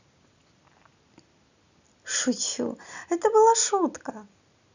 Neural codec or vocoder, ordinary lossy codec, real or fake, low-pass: none; none; real; 7.2 kHz